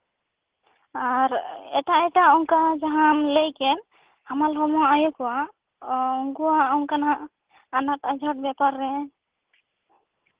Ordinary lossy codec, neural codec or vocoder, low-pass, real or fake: Opus, 16 kbps; none; 3.6 kHz; real